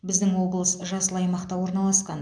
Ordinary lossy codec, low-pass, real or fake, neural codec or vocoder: none; 9.9 kHz; real; none